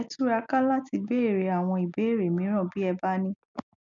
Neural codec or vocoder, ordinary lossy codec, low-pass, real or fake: none; none; 7.2 kHz; real